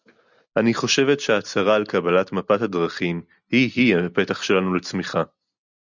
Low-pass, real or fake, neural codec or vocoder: 7.2 kHz; real; none